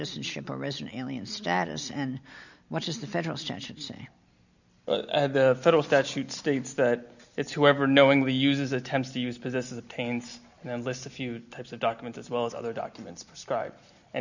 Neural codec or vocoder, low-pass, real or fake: none; 7.2 kHz; real